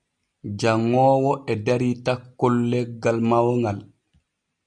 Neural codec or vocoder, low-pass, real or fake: none; 9.9 kHz; real